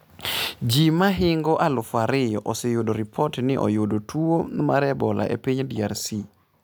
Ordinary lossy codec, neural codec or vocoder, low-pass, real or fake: none; none; none; real